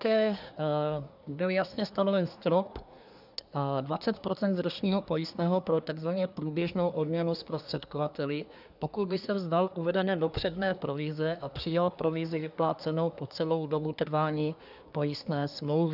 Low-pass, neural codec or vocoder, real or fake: 5.4 kHz; codec, 24 kHz, 1 kbps, SNAC; fake